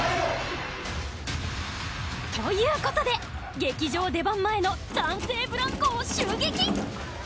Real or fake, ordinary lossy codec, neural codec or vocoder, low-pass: real; none; none; none